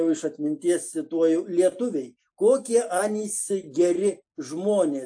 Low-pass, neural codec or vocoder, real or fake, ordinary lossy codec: 9.9 kHz; none; real; AAC, 64 kbps